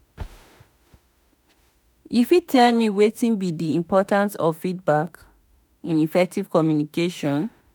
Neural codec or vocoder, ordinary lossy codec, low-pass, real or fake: autoencoder, 48 kHz, 32 numbers a frame, DAC-VAE, trained on Japanese speech; none; none; fake